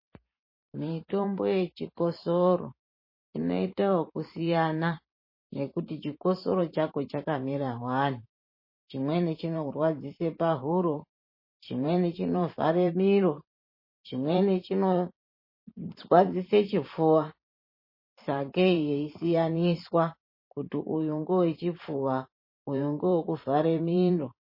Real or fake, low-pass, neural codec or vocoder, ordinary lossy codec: fake; 5.4 kHz; vocoder, 44.1 kHz, 128 mel bands every 512 samples, BigVGAN v2; MP3, 24 kbps